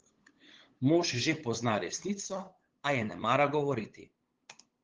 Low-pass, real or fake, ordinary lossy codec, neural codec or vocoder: 7.2 kHz; fake; Opus, 16 kbps; codec, 16 kHz, 8 kbps, FunCodec, trained on LibriTTS, 25 frames a second